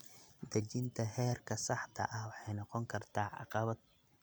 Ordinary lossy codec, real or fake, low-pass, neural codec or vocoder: none; real; none; none